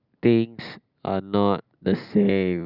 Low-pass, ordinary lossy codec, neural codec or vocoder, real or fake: 5.4 kHz; Opus, 64 kbps; none; real